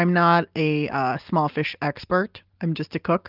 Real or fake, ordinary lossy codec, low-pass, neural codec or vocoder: real; Opus, 24 kbps; 5.4 kHz; none